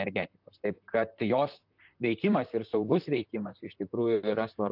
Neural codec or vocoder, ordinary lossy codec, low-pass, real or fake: vocoder, 44.1 kHz, 128 mel bands, Pupu-Vocoder; AAC, 48 kbps; 5.4 kHz; fake